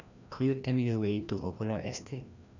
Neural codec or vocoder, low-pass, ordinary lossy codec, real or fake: codec, 16 kHz, 1 kbps, FreqCodec, larger model; 7.2 kHz; none; fake